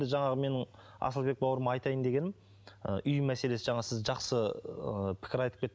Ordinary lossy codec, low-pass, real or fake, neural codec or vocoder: none; none; real; none